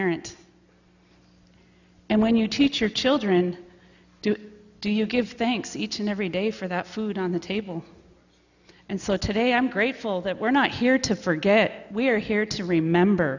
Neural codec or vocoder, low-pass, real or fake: none; 7.2 kHz; real